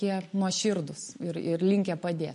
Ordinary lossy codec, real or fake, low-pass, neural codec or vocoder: MP3, 48 kbps; real; 14.4 kHz; none